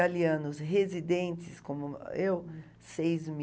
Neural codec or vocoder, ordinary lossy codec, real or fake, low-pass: none; none; real; none